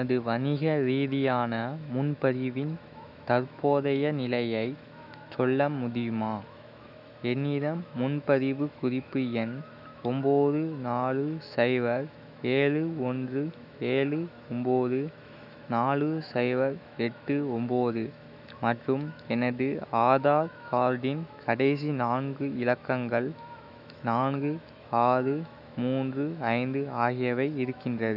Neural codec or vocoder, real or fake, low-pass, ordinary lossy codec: autoencoder, 48 kHz, 128 numbers a frame, DAC-VAE, trained on Japanese speech; fake; 5.4 kHz; none